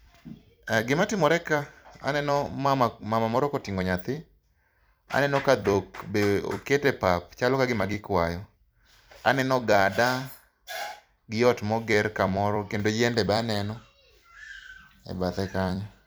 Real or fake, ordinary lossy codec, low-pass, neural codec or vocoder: fake; none; none; vocoder, 44.1 kHz, 128 mel bands every 256 samples, BigVGAN v2